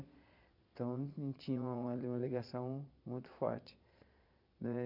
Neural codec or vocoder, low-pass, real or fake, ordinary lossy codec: vocoder, 22.05 kHz, 80 mel bands, Vocos; 5.4 kHz; fake; none